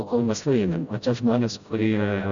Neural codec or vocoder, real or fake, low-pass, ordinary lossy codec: codec, 16 kHz, 0.5 kbps, FreqCodec, smaller model; fake; 7.2 kHz; Opus, 64 kbps